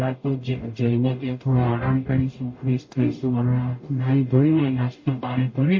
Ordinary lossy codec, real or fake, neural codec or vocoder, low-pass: MP3, 32 kbps; fake; codec, 44.1 kHz, 0.9 kbps, DAC; 7.2 kHz